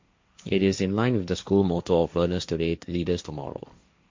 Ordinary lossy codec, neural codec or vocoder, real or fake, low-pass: MP3, 48 kbps; codec, 16 kHz, 1.1 kbps, Voila-Tokenizer; fake; 7.2 kHz